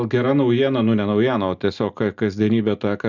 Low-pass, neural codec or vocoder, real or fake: 7.2 kHz; none; real